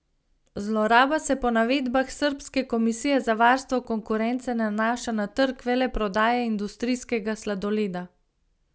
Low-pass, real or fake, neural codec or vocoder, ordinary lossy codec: none; real; none; none